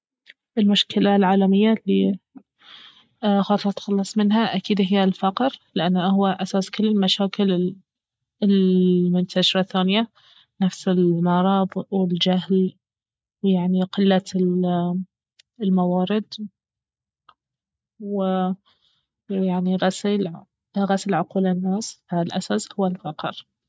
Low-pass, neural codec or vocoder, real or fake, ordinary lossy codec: none; none; real; none